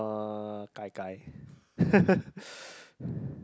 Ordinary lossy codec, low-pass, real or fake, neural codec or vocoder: none; none; real; none